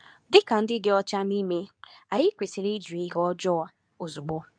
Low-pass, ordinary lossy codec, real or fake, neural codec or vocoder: 9.9 kHz; none; fake; codec, 24 kHz, 0.9 kbps, WavTokenizer, medium speech release version 2